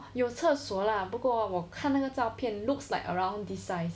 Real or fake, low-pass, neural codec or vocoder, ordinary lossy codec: real; none; none; none